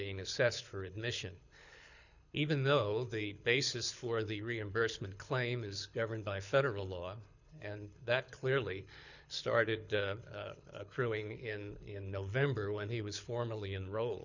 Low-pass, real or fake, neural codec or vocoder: 7.2 kHz; fake; codec, 24 kHz, 6 kbps, HILCodec